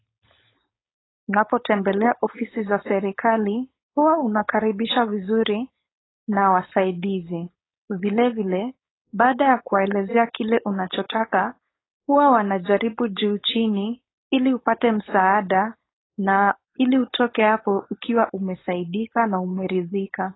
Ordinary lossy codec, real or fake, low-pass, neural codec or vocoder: AAC, 16 kbps; fake; 7.2 kHz; codec, 16 kHz, 4.8 kbps, FACodec